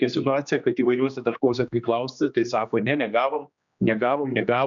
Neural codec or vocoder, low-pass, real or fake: codec, 16 kHz, 2 kbps, X-Codec, HuBERT features, trained on general audio; 7.2 kHz; fake